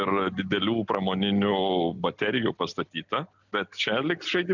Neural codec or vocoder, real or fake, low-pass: none; real; 7.2 kHz